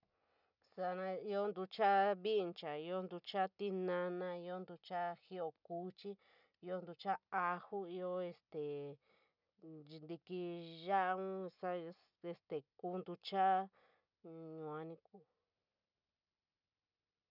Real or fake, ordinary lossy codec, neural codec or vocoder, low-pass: real; none; none; 5.4 kHz